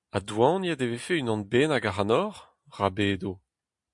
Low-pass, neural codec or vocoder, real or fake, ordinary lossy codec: 10.8 kHz; none; real; MP3, 64 kbps